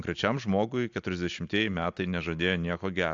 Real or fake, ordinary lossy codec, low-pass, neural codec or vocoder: real; AAC, 64 kbps; 7.2 kHz; none